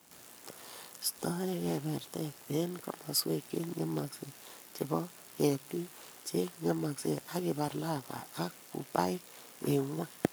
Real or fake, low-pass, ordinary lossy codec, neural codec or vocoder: fake; none; none; codec, 44.1 kHz, 7.8 kbps, Pupu-Codec